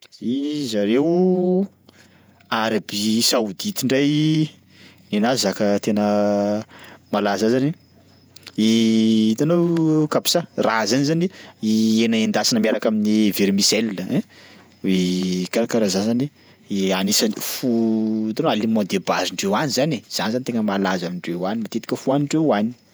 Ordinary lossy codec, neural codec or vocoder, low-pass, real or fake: none; vocoder, 48 kHz, 128 mel bands, Vocos; none; fake